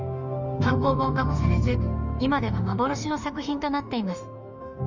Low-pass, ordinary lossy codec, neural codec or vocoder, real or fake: 7.2 kHz; none; autoencoder, 48 kHz, 32 numbers a frame, DAC-VAE, trained on Japanese speech; fake